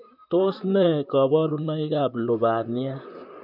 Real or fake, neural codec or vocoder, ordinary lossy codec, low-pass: fake; vocoder, 44.1 kHz, 128 mel bands, Pupu-Vocoder; none; 5.4 kHz